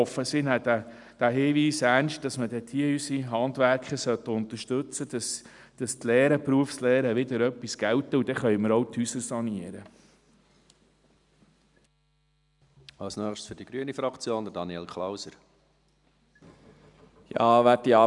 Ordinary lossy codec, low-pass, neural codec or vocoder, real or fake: none; 10.8 kHz; none; real